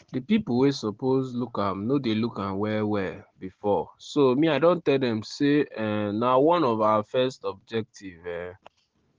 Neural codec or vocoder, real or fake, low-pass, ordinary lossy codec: none; real; 7.2 kHz; Opus, 16 kbps